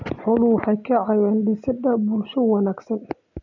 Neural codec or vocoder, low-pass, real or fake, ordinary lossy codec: none; 7.2 kHz; real; none